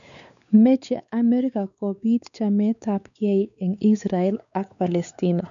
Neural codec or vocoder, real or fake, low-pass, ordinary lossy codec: codec, 16 kHz, 4 kbps, X-Codec, WavLM features, trained on Multilingual LibriSpeech; fake; 7.2 kHz; none